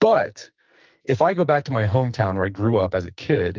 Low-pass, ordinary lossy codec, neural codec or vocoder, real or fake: 7.2 kHz; Opus, 24 kbps; codec, 44.1 kHz, 2.6 kbps, SNAC; fake